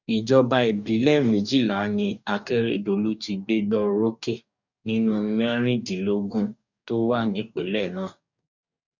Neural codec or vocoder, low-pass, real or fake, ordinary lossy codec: codec, 44.1 kHz, 2.6 kbps, DAC; 7.2 kHz; fake; none